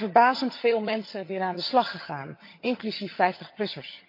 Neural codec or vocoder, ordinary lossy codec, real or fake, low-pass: vocoder, 22.05 kHz, 80 mel bands, HiFi-GAN; MP3, 32 kbps; fake; 5.4 kHz